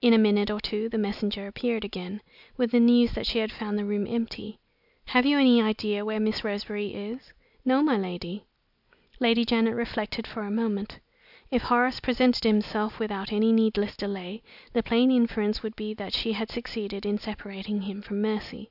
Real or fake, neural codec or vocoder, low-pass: real; none; 5.4 kHz